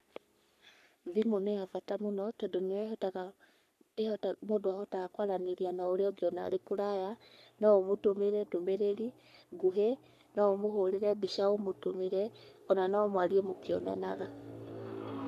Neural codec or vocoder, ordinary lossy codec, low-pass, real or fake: codec, 44.1 kHz, 3.4 kbps, Pupu-Codec; none; 14.4 kHz; fake